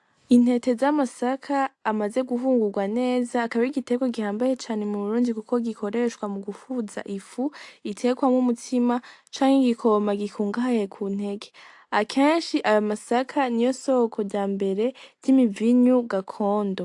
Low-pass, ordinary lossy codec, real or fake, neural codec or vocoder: 10.8 kHz; AAC, 64 kbps; real; none